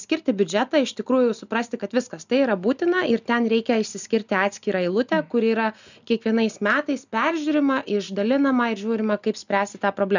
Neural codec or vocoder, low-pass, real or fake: none; 7.2 kHz; real